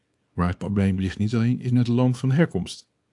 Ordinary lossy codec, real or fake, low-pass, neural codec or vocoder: AAC, 64 kbps; fake; 10.8 kHz; codec, 24 kHz, 0.9 kbps, WavTokenizer, small release